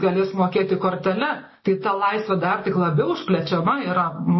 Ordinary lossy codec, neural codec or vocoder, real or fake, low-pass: MP3, 24 kbps; none; real; 7.2 kHz